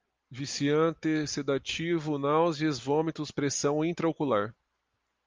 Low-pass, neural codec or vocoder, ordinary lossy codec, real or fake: 7.2 kHz; none; Opus, 24 kbps; real